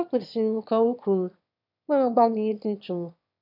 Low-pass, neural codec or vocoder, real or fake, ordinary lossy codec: 5.4 kHz; autoencoder, 22.05 kHz, a latent of 192 numbers a frame, VITS, trained on one speaker; fake; none